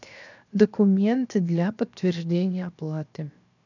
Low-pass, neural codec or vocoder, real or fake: 7.2 kHz; codec, 16 kHz, 0.7 kbps, FocalCodec; fake